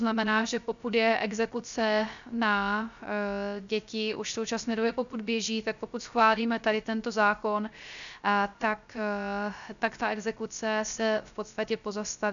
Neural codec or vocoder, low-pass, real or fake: codec, 16 kHz, 0.3 kbps, FocalCodec; 7.2 kHz; fake